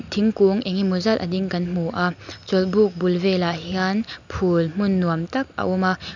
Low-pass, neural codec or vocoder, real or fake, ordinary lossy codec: 7.2 kHz; none; real; none